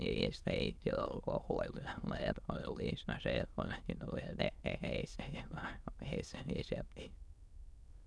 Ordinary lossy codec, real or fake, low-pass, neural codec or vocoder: none; fake; none; autoencoder, 22.05 kHz, a latent of 192 numbers a frame, VITS, trained on many speakers